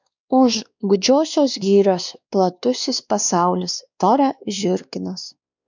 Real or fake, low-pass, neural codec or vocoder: fake; 7.2 kHz; codec, 16 kHz, 2 kbps, X-Codec, WavLM features, trained on Multilingual LibriSpeech